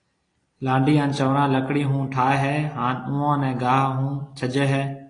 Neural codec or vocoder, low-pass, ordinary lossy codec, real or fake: none; 9.9 kHz; AAC, 32 kbps; real